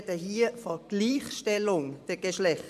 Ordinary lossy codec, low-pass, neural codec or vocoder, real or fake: MP3, 96 kbps; 14.4 kHz; vocoder, 44.1 kHz, 128 mel bands every 512 samples, BigVGAN v2; fake